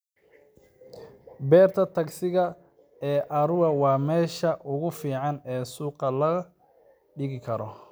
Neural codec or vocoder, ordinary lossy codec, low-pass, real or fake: none; none; none; real